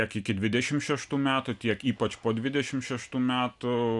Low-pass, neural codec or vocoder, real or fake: 10.8 kHz; none; real